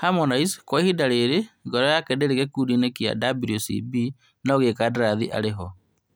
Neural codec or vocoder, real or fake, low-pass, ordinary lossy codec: none; real; none; none